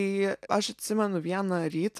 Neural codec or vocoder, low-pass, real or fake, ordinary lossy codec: none; 14.4 kHz; real; AAC, 96 kbps